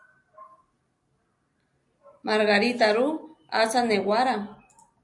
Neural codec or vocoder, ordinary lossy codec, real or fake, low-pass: none; AAC, 64 kbps; real; 10.8 kHz